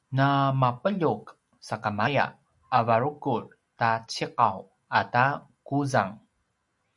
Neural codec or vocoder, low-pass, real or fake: none; 10.8 kHz; real